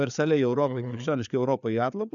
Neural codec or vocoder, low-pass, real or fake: codec, 16 kHz, 4 kbps, FreqCodec, larger model; 7.2 kHz; fake